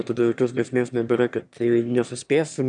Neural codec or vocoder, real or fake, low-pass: autoencoder, 22.05 kHz, a latent of 192 numbers a frame, VITS, trained on one speaker; fake; 9.9 kHz